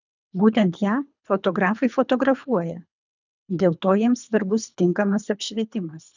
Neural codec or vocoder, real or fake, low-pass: codec, 24 kHz, 3 kbps, HILCodec; fake; 7.2 kHz